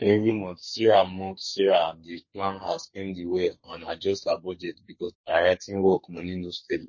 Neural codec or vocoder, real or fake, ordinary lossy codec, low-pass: codec, 32 kHz, 1.9 kbps, SNAC; fake; MP3, 32 kbps; 7.2 kHz